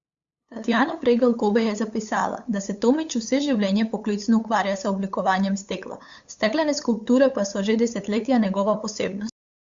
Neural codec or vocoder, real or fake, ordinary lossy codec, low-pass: codec, 16 kHz, 8 kbps, FunCodec, trained on LibriTTS, 25 frames a second; fake; Opus, 64 kbps; 7.2 kHz